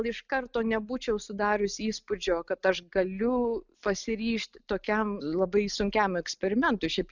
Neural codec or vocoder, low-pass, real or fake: none; 7.2 kHz; real